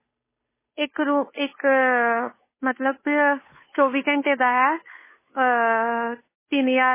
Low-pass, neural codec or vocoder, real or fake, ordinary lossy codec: 3.6 kHz; codec, 16 kHz, 2 kbps, FunCodec, trained on Chinese and English, 25 frames a second; fake; MP3, 16 kbps